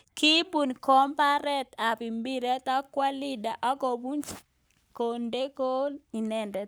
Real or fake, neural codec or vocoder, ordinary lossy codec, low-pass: fake; codec, 44.1 kHz, 7.8 kbps, Pupu-Codec; none; none